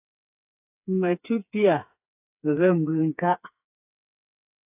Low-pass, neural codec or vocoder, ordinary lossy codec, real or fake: 3.6 kHz; codec, 16 kHz, 4 kbps, FreqCodec, smaller model; AAC, 32 kbps; fake